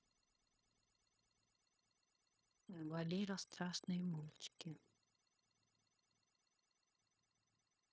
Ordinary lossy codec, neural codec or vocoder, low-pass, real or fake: none; codec, 16 kHz, 0.9 kbps, LongCat-Audio-Codec; none; fake